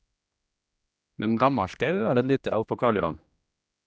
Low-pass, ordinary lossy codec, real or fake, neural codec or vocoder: none; none; fake; codec, 16 kHz, 1 kbps, X-Codec, HuBERT features, trained on general audio